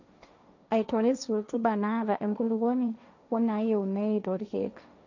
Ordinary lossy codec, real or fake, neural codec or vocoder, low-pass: none; fake; codec, 16 kHz, 1.1 kbps, Voila-Tokenizer; 7.2 kHz